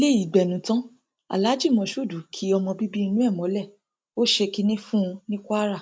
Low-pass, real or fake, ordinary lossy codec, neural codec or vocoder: none; real; none; none